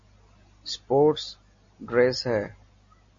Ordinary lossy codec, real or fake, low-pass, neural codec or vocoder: MP3, 32 kbps; real; 7.2 kHz; none